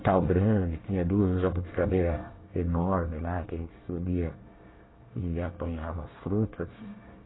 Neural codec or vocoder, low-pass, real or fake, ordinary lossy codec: codec, 24 kHz, 1 kbps, SNAC; 7.2 kHz; fake; AAC, 16 kbps